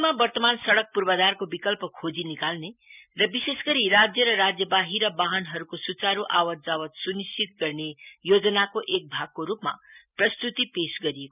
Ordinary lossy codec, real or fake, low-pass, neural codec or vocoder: none; real; 3.6 kHz; none